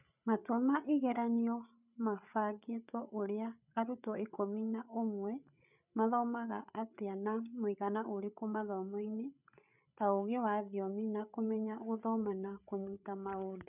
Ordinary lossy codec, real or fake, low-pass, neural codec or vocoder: none; fake; 3.6 kHz; codec, 16 kHz, 8 kbps, FreqCodec, larger model